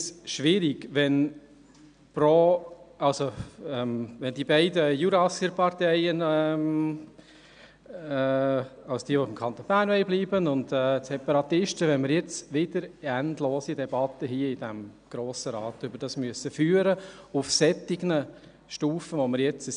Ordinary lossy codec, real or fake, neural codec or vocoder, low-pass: none; real; none; 9.9 kHz